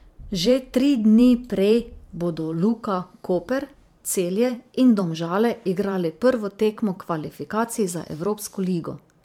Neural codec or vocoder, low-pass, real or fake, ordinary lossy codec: vocoder, 44.1 kHz, 128 mel bands every 512 samples, BigVGAN v2; 19.8 kHz; fake; MP3, 96 kbps